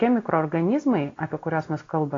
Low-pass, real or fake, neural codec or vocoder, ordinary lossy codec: 7.2 kHz; real; none; AAC, 32 kbps